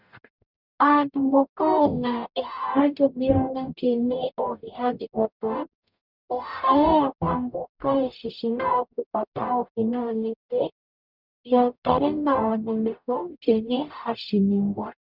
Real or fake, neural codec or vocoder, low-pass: fake; codec, 44.1 kHz, 0.9 kbps, DAC; 5.4 kHz